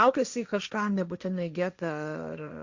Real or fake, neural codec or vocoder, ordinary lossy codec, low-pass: fake; codec, 16 kHz, 1.1 kbps, Voila-Tokenizer; Opus, 64 kbps; 7.2 kHz